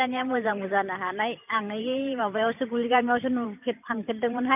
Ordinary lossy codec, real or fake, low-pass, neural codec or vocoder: none; fake; 3.6 kHz; vocoder, 44.1 kHz, 128 mel bands every 512 samples, BigVGAN v2